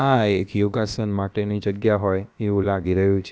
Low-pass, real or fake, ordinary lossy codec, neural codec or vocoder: none; fake; none; codec, 16 kHz, about 1 kbps, DyCAST, with the encoder's durations